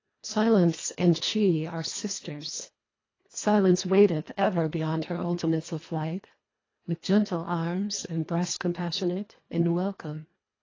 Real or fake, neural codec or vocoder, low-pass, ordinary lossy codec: fake; codec, 24 kHz, 1.5 kbps, HILCodec; 7.2 kHz; AAC, 32 kbps